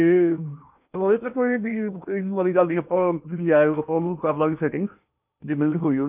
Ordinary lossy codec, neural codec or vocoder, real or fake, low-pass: none; codec, 16 kHz in and 24 kHz out, 0.8 kbps, FocalCodec, streaming, 65536 codes; fake; 3.6 kHz